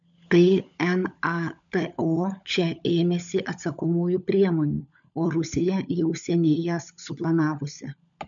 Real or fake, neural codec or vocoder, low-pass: fake; codec, 16 kHz, 16 kbps, FunCodec, trained on LibriTTS, 50 frames a second; 7.2 kHz